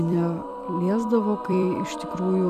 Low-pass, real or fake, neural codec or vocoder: 14.4 kHz; fake; vocoder, 44.1 kHz, 128 mel bands every 256 samples, BigVGAN v2